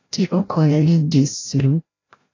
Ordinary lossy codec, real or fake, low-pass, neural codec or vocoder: AAC, 32 kbps; fake; 7.2 kHz; codec, 16 kHz, 0.5 kbps, FreqCodec, larger model